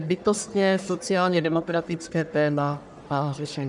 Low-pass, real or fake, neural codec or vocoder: 10.8 kHz; fake; codec, 44.1 kHz, 1.7 kbps, Pupu-Codec